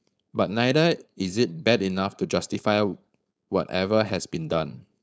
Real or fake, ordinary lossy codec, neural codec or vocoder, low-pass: fake; none; codec, 16 kHz, 4.8 kbps, FACodec; none